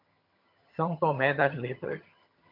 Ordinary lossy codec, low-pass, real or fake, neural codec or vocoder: MP3, 48 kbps; 5.4 kHz; fake; vocoder, 22.05 kHz, 80 mel bands, HiFi-GAN